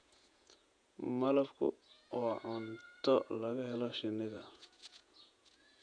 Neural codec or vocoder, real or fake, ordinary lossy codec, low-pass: vocoder, 48 kHz, 128 mel bands, Vocos; fake; none; 9.9 kHz